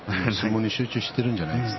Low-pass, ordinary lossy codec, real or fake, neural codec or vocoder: 7.2 kHz; MP3, 24 kbps; real; none